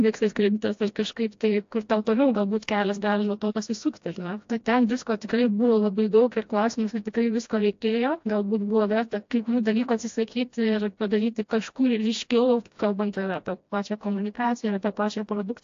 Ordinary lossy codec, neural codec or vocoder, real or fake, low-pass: AAC, 48 kbps; codec, 16 kHz, 1 kbps, FreqCodec, smaller model; fake; 7.2 kHz